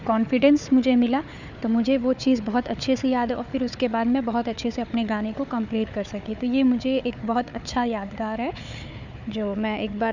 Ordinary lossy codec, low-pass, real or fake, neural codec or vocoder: none; 7.2 kHz; fake; codec, 16 kHz, 4 kbps, FunCodec, trained on Chinese and English, 50 frames a second